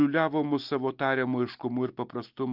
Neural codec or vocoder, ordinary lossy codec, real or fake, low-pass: none; Opus, 32 kbps; real; 5.4 kHz